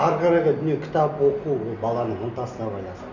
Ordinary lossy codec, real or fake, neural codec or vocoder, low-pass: none; real; none; 7.2 kHz